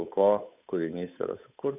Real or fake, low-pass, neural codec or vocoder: fake; 3.6 kHz; codec, 16 kHz, 8 kbps, FunCodec, trained on Chinese and English, 25 frames a second